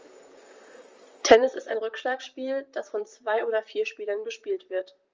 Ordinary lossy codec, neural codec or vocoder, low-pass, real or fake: Opus, 32 kbps; vocoder, 44.1 kHz, 128 mel bands, Pupu-Vocoder; 7.2 kHz; fake